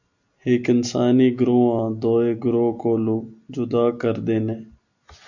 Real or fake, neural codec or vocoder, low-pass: real; none; 7.2 kHz